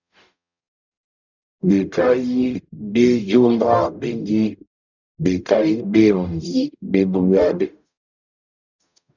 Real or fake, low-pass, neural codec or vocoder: fake; 7.2 kHz; codec, 44.1 kHz, 0.9 kbps, DAC